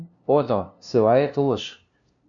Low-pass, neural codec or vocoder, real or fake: 7.2 kHz; codec, 16 kHz, 0.5 kbps, FunCodec, trained on LibriTTS, 25 frames a second; fake